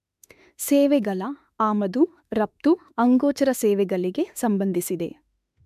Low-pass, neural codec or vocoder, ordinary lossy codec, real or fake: 14.4 kHz; autoencoder, 48 kHz, 32 numbers a frame, DAC-VAE, trained on Japanese speech; MP3, 96 kbps; fake